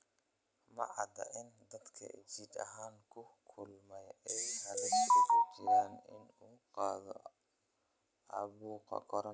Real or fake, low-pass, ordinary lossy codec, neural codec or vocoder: real; none; none; none